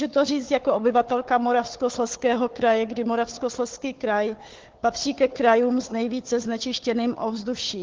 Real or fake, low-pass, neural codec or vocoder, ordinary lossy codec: fake; 7.2 kHz; codec, 16 kHz, 4 kbps, FunCodec, trained on Chinese and English, 50 frames a second; Opus, 16 kbps